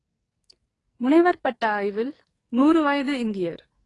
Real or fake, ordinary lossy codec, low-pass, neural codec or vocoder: fake; AAC, 32 kbps; 10.8 kHz; codec, 44.1 kHz, 2.6 kbps, SNAC